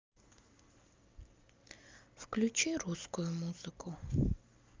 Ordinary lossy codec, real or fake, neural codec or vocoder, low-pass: Opus, 24 kbps; real; none; 7.2 kHz